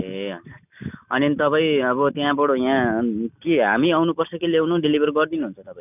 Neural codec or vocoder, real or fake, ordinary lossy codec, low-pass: none; real; none; 3.6 kHz